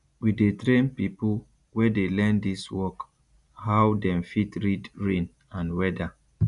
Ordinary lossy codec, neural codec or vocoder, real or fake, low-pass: none; none; real; 10.8 kHz